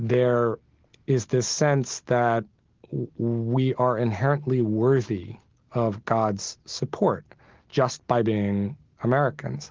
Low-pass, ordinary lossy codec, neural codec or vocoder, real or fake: 7.2 kHz; Opus, 16 kbps; none; real